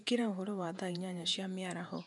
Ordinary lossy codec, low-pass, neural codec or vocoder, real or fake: none; 10.8 kHz; vocoder, 48 kHz, 128 mel bands, Vocos; fake